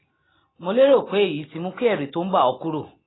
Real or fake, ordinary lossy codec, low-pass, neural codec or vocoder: real; AAC, 16 kbps; 7.2 kHz; none